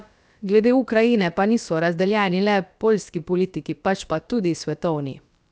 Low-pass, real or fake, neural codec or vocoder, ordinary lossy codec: none; fake; codec, 16 kHz, about 1 kbps, DyCAST, with the encoder's durations; none